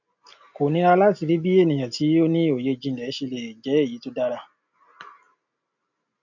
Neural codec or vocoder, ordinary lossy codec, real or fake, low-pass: none; none; real; 7.2 kHz